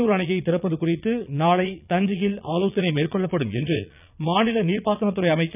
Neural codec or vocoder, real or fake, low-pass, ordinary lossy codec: vocoder, 22.05 kHz, 80 mel bands, Vocos; fake; 3.6 kHz; none